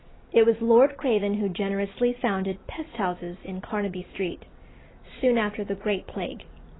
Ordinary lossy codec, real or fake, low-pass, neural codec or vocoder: AAC, 16 kbps; real; 7.2 kHz; none